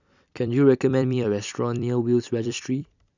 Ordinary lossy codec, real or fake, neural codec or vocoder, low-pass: none; fake; vocoder, 44.1 kHz, 128 mel bands every 256 samples, BigVGAN v2; 7.2 kHz